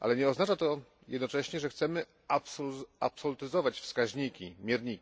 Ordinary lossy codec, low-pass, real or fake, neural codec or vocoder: none; none; real; none